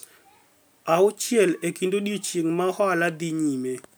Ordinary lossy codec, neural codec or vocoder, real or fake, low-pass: none; none; real; none